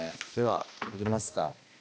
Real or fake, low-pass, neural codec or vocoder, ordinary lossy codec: fake; none; codec, 16 kHz, 1 kbps, X-Codec, HuBERT features, trained on balanced general audio; none